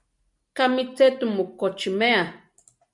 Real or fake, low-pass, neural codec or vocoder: real; 10.8 kHz; none